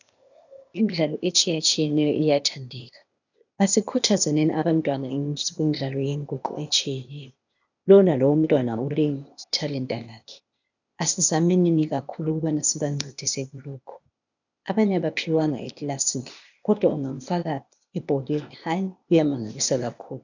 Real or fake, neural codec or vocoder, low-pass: fake; codec, 16 kHz, 0.8 kbps, ZipCodec; 7.2 kHz